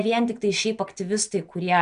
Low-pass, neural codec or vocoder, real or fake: 9.9 kHz; none; real